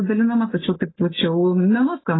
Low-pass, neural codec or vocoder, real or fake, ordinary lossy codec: 7.2 kHz; none; real; AAC, 16 kbps